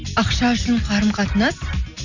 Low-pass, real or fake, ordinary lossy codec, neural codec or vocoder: 7.2 kHz; real; none; none